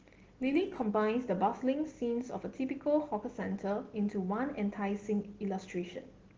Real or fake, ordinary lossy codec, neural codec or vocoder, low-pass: real; Opus, 16 kbps; none; 7.2 kHz